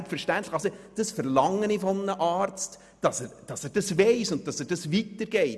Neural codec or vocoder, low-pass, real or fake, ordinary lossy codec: none; none; real; none